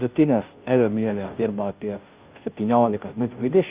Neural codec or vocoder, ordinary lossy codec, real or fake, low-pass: codec, 16 kHz, 0.5 kbps, FunCodec, trained on Chinese and English, 25 frames a second; Opus, 24 kbps; fake; 3.6 kHz